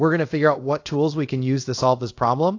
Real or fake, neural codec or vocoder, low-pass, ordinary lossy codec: fake; codec, 24 kHz, 0.5 kbps, DualCodec; 7.2 kHz; AAC, 48 kbps